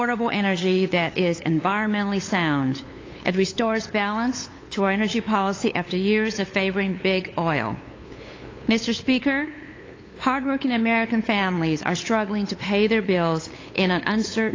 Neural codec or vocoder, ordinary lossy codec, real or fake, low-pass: codec, 16 kHz, 8 kbps, FunCodec, trained on LibriTTS, 25 frames a second; AAC, 32 kbps; fake; 7.2 kHz